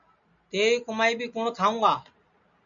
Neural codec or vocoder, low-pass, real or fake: none; 7.2 kHz; real